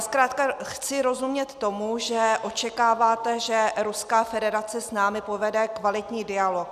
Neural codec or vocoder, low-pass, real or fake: none; 14.4 kHz; real